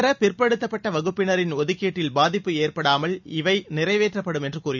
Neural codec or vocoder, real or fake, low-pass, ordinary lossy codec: none; real; 7.2 kHz; none